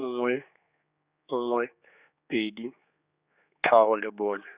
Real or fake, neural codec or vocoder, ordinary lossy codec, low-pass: fake; codec, 16 kHz, 4 kbps, X-Codec, HuBERT features, trained on general audio; Opus, 64 kbps; 3.6 kHz